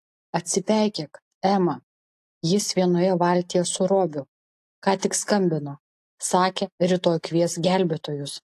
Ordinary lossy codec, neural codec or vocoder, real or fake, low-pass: AAC, 64 kbps; none; real; 14.4 kHz